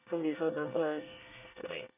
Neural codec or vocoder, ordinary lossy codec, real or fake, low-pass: codec, 24 kHz, 1 kbps, SNAC; none; fake; 3.6 kHz